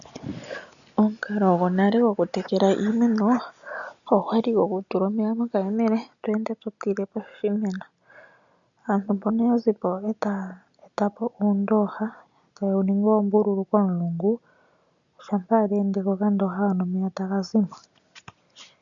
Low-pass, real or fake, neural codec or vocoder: 7.2 kHz; real; none